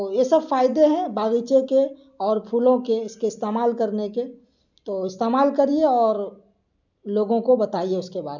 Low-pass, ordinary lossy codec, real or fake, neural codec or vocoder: 7.2 kHz; none; real; none